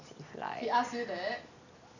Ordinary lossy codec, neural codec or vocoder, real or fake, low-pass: none; none; real; 7.2 kHz